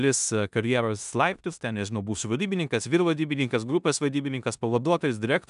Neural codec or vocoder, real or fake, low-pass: codec, 16 kHz in and 24 kHz out, 0.9 kbps, LongCat-Audio-Codec, four codebook decoder; fake; 10.8 kHz